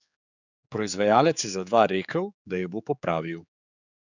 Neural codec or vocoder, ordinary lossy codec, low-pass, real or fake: codec, 16 kHz, 4 kbps, X-Codec, HuBERT features, trained on general audio; none; 7.2 kHz; fake